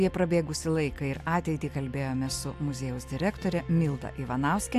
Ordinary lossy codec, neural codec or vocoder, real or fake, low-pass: AAC, 96 kbps; none; real; 14.4 kHz